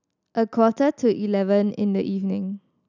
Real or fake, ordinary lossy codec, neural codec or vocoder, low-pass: real; none; none; 7.2 kHz